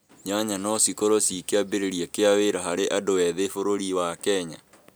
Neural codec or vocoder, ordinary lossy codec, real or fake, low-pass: none; none; real; none